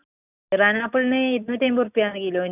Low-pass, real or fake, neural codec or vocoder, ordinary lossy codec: 3.6 kHz; real; none; none